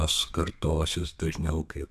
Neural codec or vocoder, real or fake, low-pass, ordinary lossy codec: codec, 32 kHz, 1.9 kbps, SNAC; fake; 14.4 kHz; AAC, 96 kbps